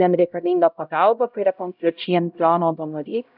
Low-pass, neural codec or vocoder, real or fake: 5.4 kHz; codec, 16 kHz, 0.5 kbps, X-Codec, HuBERT features, trained on LibriSpeech; fake